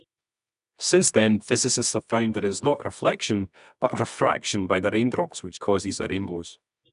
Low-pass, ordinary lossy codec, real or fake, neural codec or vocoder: 10.8 kHz; none; fake; codec, 24 kHz, 0.9 kbps, WavTokenizer, medium music audio release